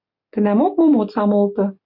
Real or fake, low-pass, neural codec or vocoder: real; 5.4 kHz; none